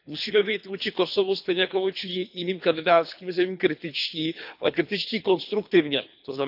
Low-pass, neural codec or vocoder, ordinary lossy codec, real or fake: 5.4 kHz; codec, 24 kHz, 3 kbps, HILCodec; none; fake